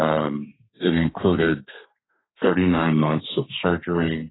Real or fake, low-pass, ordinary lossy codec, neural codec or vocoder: fake; 7.2 kHz; AAC, 16 kbps; codec, 32 kHz, 1.9 kbps, SNAC